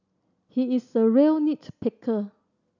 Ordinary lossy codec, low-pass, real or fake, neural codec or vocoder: none; 7.2 kHz; real; none